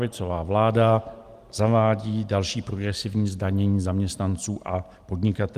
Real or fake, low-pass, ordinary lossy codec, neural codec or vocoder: real; 14.4 kHz; Opus, 24 kbps; none